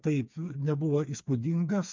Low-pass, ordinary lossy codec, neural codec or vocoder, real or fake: 7.2 kHz; MP3, 64 kbps; codec, 16 kHz, 4 kbps, FreqCodec, smaller model; fake